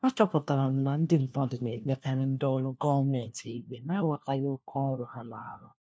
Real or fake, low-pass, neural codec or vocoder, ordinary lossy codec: fake; none; codec, 16 kHz, 1 kbps, FunCodec, trained on LibriTTS, 50 frames a second; none